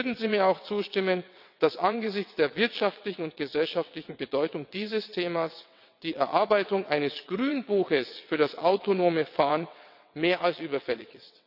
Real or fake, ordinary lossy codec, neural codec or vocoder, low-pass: fake; none; vocoder, 22.05 kHz, 80 mel bands, WaveNeXt; 5.4 kHz